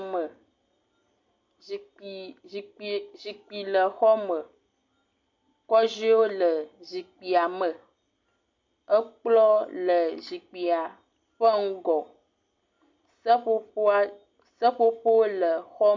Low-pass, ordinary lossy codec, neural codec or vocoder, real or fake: 7.2 kHz; MP3, 48 kbps; none; real